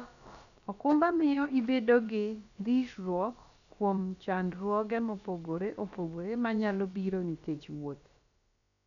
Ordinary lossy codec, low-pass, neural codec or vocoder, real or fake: none; 7.2 kHz; codec, 16 kHz, about 1 kbps, DyCAST, with the encoder's durations; fake